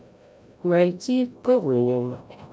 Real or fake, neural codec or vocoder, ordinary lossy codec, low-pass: fake; codec, 16 kHz, 0.5 kbps, FreqCodec, larger model; none; none